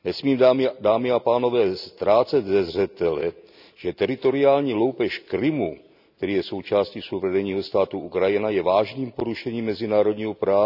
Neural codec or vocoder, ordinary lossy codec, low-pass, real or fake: none; none; 5.4 kHz; real